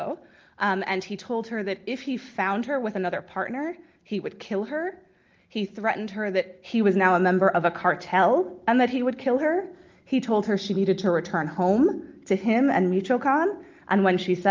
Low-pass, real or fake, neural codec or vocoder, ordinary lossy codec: 7.2 kHz; real; none; Opus, 32 kbps